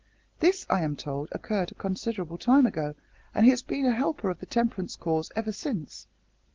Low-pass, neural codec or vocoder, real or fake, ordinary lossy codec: 7.2 kHz; none; real; Opus, 16 kbps